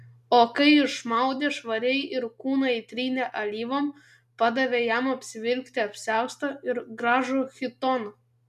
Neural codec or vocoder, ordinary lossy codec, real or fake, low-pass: none; MP3, 96 kbps; real; 14.4 kHz